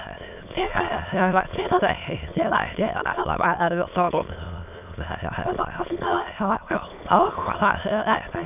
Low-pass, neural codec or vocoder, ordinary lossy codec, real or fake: 3.6 kHz; autoencoder, 22.05 kHz, a latent of 192 numbers a frame, VITS, trained on many speakers; none; fake